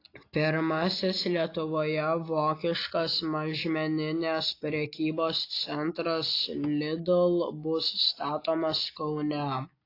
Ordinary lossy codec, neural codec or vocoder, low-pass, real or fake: AAC, 32 kbps; none; 5.4 kHz; real